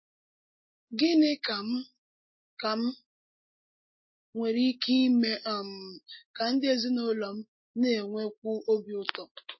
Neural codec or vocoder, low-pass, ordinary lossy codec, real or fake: none; 7.2 kHz; MP3, 24 kbps; real